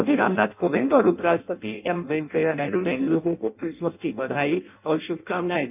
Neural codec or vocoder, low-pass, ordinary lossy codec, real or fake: codec, 16 kHz in and 24 kHz out, 0.6 kbps, FireRedTTS-2 codec; 3.6 kHz; none; fake